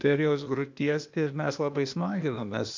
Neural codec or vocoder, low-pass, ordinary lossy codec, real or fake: codec, 16 kHz, 0.8 kbps, ZipCodec; 7.2 kHz; MP3, 64 kbps; fake